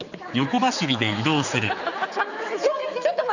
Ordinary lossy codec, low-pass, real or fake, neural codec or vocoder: none; 7.2 kHz; fake; codec, 16 kHz, 4 kbps, X-Codec, HuBERT features, trained on general audio